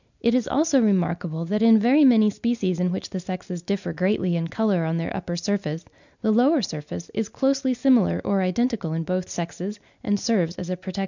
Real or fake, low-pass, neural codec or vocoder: real; 7.2 kHz; none